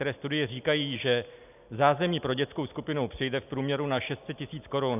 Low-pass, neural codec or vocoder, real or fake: 3.6 kHz; none; real